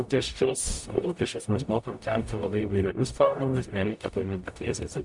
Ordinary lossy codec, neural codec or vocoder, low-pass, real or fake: MP3, 64 kbps; codec, 44.1 kHz, 0.9 kbps, DAC; 10.8 kHz; fake